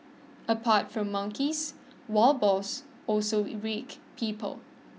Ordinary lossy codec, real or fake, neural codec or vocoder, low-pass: none; real; none; none